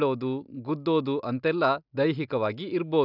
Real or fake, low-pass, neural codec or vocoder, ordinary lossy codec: real; 5.4 kHz; none; none